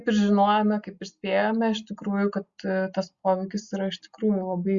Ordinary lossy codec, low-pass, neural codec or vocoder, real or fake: Opus, 64 kbps; 7.2 kHz; none; real